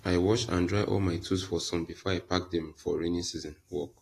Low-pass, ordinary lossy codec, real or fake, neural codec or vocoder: 14.4 kHz; AAC, 48 kbps; real; none